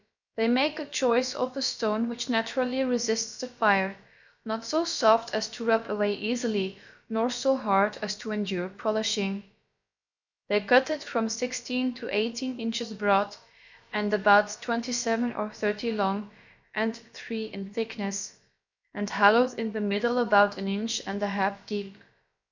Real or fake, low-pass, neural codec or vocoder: fake; 7.2 kHz; codec, 16 kHz, about 1 kbps, DyCAST, with the encoder's durations